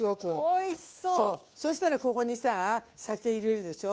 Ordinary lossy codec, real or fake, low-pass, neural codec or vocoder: none; fake; none; codec, 16 kHz, 2 kbps, FunCodec, trained on Chinese and English, 25 frames a second